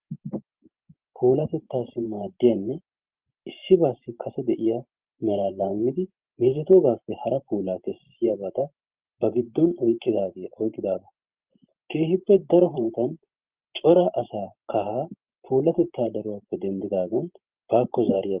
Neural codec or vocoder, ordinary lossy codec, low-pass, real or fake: vocoder, 44.1 kHz, 128 mel bands every 512 samples, BigVGAN v2; Opus, 32 kbps; 3.6 kHz; fake